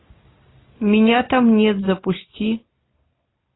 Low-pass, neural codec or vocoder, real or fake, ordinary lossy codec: 7.2 kHz; none; real; AAC, 16 kbps